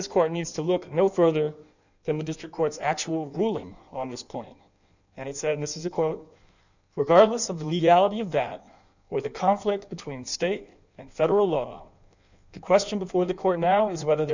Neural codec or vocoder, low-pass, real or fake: codec, 16 kHz in and 24 kHz out, 1.1 kbps, FireRedTTS-2 codec; 7.2 kHz; fake